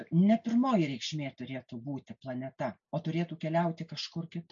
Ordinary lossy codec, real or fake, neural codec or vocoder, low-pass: MP3, 48 kbps; real; none; 7.2 kHz